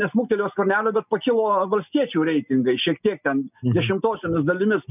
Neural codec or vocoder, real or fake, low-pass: none; real; 3.6 kHz